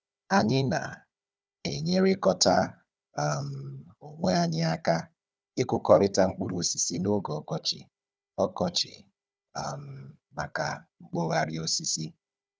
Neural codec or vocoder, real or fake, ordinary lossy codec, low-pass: codec, 16 kHz, 4 kbps, FunCodec, trained on Chinese and English, 50 frames a second; fake; none; none